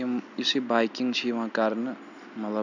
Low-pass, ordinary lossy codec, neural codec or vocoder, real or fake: 7.2 kHz; none; none; real